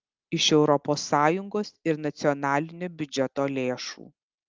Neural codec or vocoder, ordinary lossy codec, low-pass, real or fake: none; Opus, 32 kbps; 7.2 kHz; real